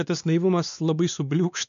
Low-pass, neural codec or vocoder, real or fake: 7.2 kHz; codec, 16 kHz, 2 kbps, X-Codec, WavLM features, trained on Multilingual LibriSpeech; fake